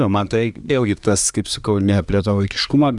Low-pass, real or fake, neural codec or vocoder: 10.8 kHz; fake; codec, 24 kHz, 1 kbps, SNAC